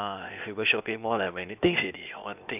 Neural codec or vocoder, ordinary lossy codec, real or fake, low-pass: codec, 16 kHz, 0.8 kbps, ZipCodec; none; fake; 3.6 kHz